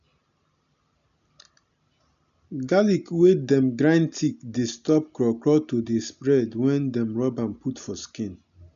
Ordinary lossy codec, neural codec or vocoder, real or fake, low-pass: none; none; real; 7.2 kHz